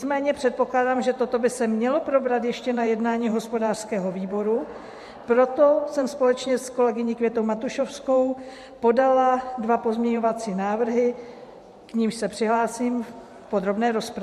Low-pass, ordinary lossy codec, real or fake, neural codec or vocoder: 14.4 kHz; MP3, 64 kbps; fake; vocoder, 44.1 kHz, 128 mel bands every 512 samples, BigVGAN v2